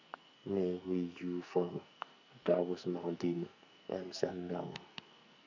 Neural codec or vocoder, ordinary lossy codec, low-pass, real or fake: codec, 44.1 kHz, 2.6 kbps, SNAC; none; 7.2 kHz; fake